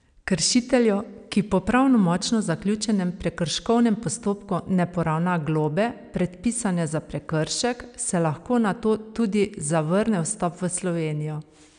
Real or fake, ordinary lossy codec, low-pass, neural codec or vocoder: real; none; 9.9 kHz; none